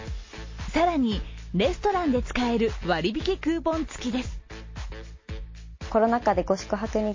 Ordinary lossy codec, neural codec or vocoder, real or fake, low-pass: MP3, 32 kbps; none; real; 7.2 kHz